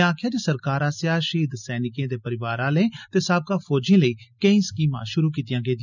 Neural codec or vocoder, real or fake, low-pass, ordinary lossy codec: none; real; 7.2 kHz; none